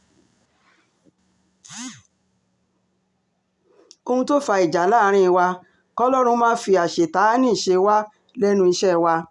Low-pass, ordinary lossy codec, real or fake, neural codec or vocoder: 10.8 kHz; none; real; none